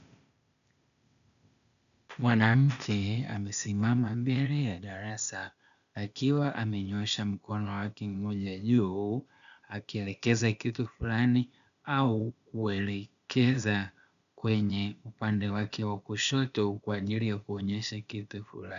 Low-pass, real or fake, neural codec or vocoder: 7.2 kHz; fake; codec, 16 kHz, 0.8 kbps, ZipCodec